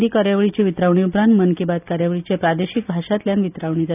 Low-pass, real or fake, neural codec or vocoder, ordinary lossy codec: 3.6 kHz; real; none; none